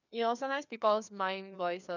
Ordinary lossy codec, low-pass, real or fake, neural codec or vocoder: none; 7.2 kHz; fake; codec, 16 kHz, 2 kbps, FreqCodec, larger model